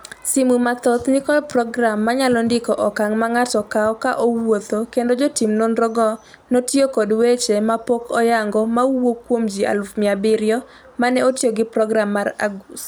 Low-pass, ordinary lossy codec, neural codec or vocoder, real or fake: none; none; none; real